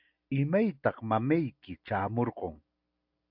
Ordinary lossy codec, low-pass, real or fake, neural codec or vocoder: MP3, 48 kbps; 5.4 kHz; real; none